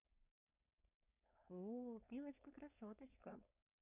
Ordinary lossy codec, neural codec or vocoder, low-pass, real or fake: none; codec, 16 kHz, 4.8 kbps, FACodec; 3.6 kHz; fake